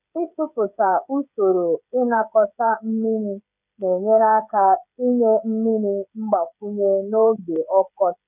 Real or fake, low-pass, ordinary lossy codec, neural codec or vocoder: fake; 3.6 kHz; none; codec, 16 kHz, 16 kbps, FreqCodec, smaller model